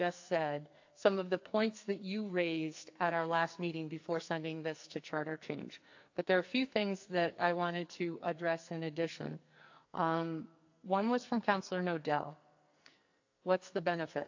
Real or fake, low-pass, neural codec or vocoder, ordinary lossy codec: fake; 7.2 kHz; codec, 44.1 kHz, 2.6 kbps, SNAC; AAC, 48 kbps